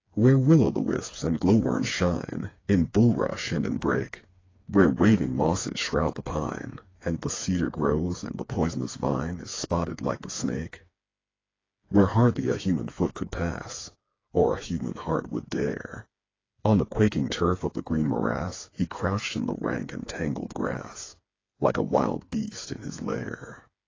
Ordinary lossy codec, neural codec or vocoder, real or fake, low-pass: AAC, 32 kbps; codec, 16 kHz, 4 kbps, FreqCodec, smaller model; fake; 7.2 kHz